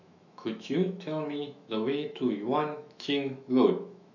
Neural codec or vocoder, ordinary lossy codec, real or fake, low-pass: autoencoder, 48 kHz, 128 numbers a frame, DAC-VAE, trained on Japanese speech; none; fake; 7.2 kHz